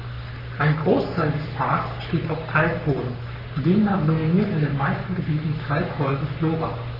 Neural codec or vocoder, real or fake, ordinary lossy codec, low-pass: codec, 44.1 kHz, 3.4 kbps, Pupu-Codec; fake; none; 5.4 kHz